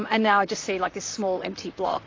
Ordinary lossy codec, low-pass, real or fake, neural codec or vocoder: AAC, 32 kbps; 7.2 kHz; fake; vocoder, 44.1 kHz, 128 mel bands, Pupu-Vocoder